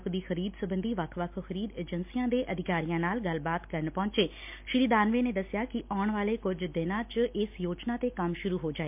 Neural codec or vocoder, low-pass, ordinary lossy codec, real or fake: none; 3.6 kHz; MP3, 32 kbps; real